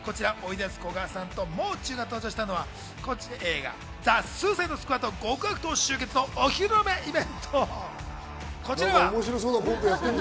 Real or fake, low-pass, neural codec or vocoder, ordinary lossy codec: real; none; none; none